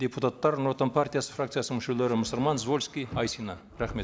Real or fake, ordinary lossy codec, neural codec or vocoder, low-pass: real; none; none; none